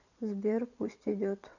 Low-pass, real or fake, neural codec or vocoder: 7.2 kHz; fake; vocoder, 22.05 kHz, 80 mel bands, WaveNeXt